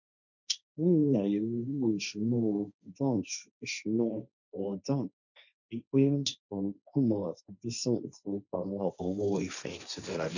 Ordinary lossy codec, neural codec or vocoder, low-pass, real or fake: none; codec, 16 kHz, 1.1 kbps, Voila-Tokenizer; 7.2 kHz; fake